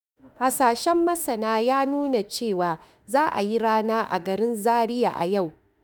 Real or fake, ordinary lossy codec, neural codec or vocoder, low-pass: fake; none; autoencoder, 48 kHz, 32 numbers a frame, DAC-VAE, trained on Japanese speech; none